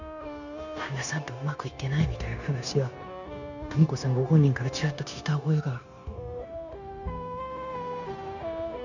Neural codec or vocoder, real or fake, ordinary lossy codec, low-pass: codec, 16 kHz, 0.9 kbps, LongCat-Audio-Codec; fake; none; 7.2 kHz